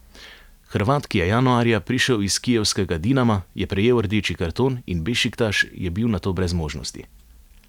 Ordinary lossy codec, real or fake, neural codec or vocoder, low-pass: none; real; none; 19.8 kHz